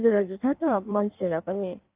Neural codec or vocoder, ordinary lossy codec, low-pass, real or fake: codec, 16 kHz in and 24 kHz out, 1.1 kbps, FireRedTTS-2 codec; Opus, 24 kbps; 3.6 kHz; fake